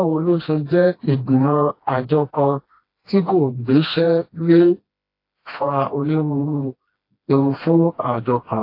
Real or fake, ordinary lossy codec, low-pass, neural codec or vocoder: fake; AAC, 32 kbps; 5.4 kHz; codec, 16 kHz, 1 kbps, FreqCodec, smaller model